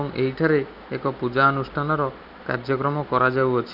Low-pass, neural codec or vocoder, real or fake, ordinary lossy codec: 5.4 kHz; none; real; none